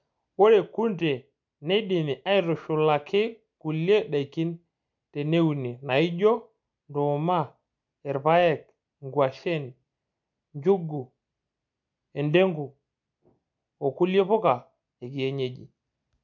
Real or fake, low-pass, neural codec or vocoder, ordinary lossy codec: real; 7.2 kHz; none; MP3, 64 kbps